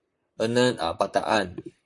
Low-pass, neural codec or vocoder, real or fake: 10.8 kHz; vocoder, 44.1 kHz, 128 mel bands, Pupu-Vocoder; fake